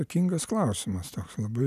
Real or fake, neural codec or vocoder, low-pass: real; none; 14.4 kHz